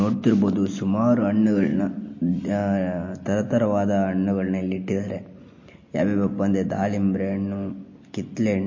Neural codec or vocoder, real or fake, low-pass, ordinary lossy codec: none; real; 7.2 kHz; MP3, 32 kbps